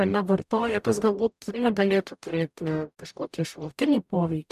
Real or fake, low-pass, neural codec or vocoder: fake; 14.4 kHz; codec, 44.1 kHz, 0.9 kbps, DAC